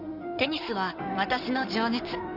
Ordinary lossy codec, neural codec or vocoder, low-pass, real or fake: none; codec, 16 kHz in and 24 kHz out, 2.2 kbps, FireRedTTS-2 codec; 5.4 kHz; fake